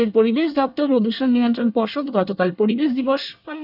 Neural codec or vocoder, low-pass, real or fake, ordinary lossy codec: codec, 24 kHz, 1 kbps, SNAC; 5.4 kHz; fake; none